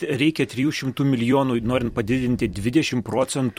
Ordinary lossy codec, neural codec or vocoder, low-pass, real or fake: MP3, 64 kbps; vocoder, 44.1 kHz, 128 mel bands every 256 samples, BigVGAN v2; 19.8 kHz; fake